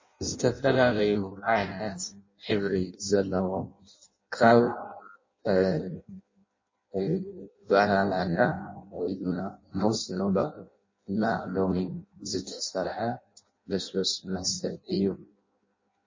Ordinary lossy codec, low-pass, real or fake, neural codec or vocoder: MP3, 32 kbps; 7.2 kHz; fake; codec, 16 kHz in and 24 kHz out, 0.6 kbps, FireRedTTS-2 codec